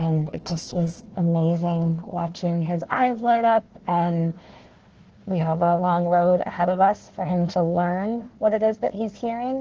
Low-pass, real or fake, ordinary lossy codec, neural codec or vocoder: 7.2 kHz; fake; Opus, 16 kbps; codec, 16 kHz, 1 kbps, FunCodec, trained on Chinese and English, 50 frames a second